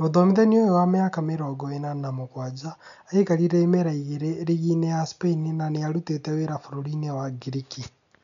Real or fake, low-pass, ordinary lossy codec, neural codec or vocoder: real; 7.2 kHz; none; none